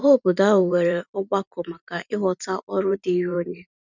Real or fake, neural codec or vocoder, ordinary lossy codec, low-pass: fake; vocoder, 44.1 kHz, 128 mel bands every 512 samples, BigVGAN v2; none; 7.2 kHz